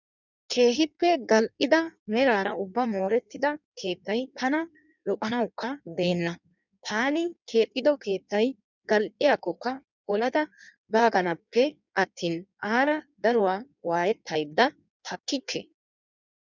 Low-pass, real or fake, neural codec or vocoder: 7.2 kHz; fake; codec, 16 kHz in and 24 kHz out, 1.1 kbps, FireRedTTS-2 codec